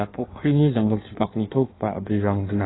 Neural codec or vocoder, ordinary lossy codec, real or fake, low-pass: codec, 16 kHz in and 24 kHz out, 1.1 kbps, FireRedTTS-2 codec; AAC, 16 kbps; fake; 7.2 kHz